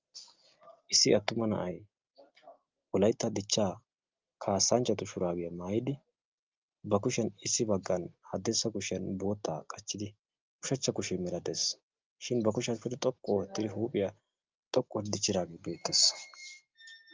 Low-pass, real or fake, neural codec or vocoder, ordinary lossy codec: 7.2 kHz; real; none; Opus, 32 kbps